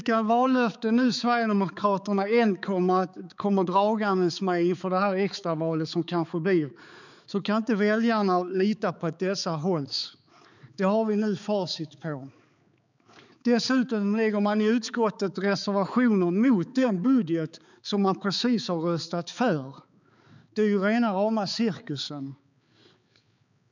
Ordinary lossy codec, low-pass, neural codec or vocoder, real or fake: none; 7.2 kHz; codec, 16 kHz, 4 kbps, X-Codec, HuBERT features, trained on balanced general audio; fake